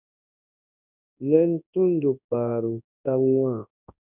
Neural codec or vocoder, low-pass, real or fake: codec, 24 kHz, 0.9 kbps, WavTokenizer, large speech release; 3.6 kHz; fake